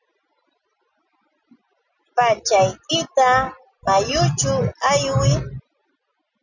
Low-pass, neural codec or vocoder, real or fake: 7.2 kHz; none; real